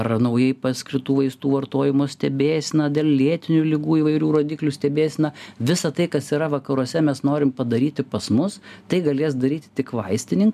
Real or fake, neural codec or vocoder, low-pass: real; none; 14.4 kHz